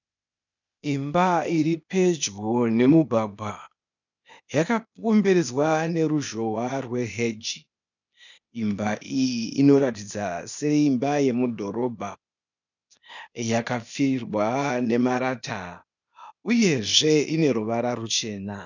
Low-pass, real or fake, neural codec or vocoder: 7.2 kHz; fake; codec, 16 kHz, 0.8 kbps, ZipCodec